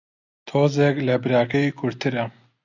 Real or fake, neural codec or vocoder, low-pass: real; none; 7.2 kHz